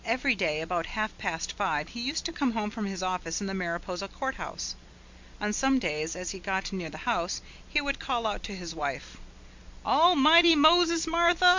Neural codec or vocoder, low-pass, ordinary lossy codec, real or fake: none; 7.2 kHz; MP3, 64 kbps; real